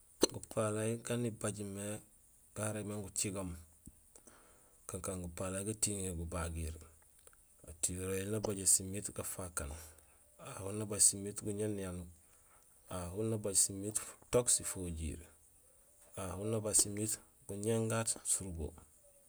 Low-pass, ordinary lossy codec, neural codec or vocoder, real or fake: none; none; none; real